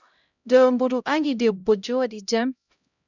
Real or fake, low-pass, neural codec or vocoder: fake; 7.2 kHz; codec, 16 kHz, 0.5 kbps, X-Codec, HuBERT features, trained on LibriSpeech